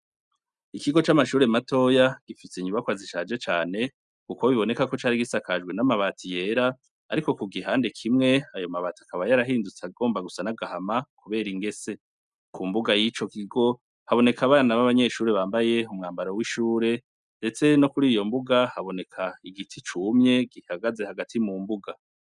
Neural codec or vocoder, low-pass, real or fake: none; 10.8 kHz; real